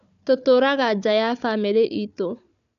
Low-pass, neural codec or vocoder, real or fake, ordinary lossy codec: 7.2 kHz; codec, 16 kHz, 4 kbps, FunCodec, trained on Chinese and English, 50 frames a second; fake; none